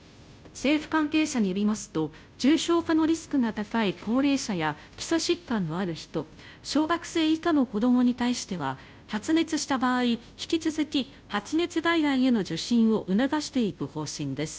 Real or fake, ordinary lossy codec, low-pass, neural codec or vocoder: fake; none; none; codec, 16 kHz, 0.5 kbps, FunCodec, trained on Chinese and English, 25 frames a second